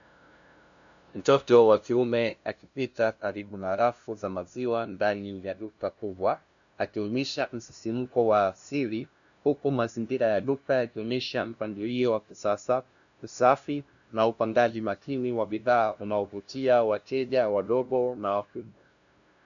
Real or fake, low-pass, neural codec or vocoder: fake; 7.2 kHz; codec, 16 kHz, 0.5 kbps, FunCodec, trained on LibriTTS, 25 frames a second